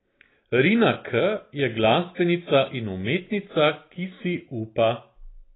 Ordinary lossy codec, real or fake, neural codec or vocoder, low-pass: AAC, 16 kbps; real; none; 7.2 kHz